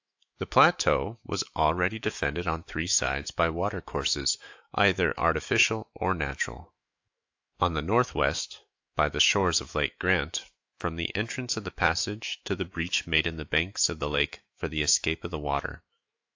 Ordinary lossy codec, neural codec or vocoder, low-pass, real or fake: AAC, 48 kbps; autoencoder, 48 kHz, 128 numbers a frame, DAC-VAE, trained on Japanese speech; 7.2 kHz; fake